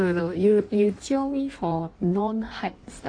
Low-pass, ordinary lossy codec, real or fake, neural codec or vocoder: 9.9 kHz; AAC, 48 kbps; fake; codec, 16 kHz in and 24 kHz out, 1.1 kbps, FireRedTTS-2 codec